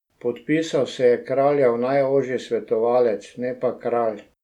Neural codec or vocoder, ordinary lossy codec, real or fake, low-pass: none; none; real; 19.8 kHz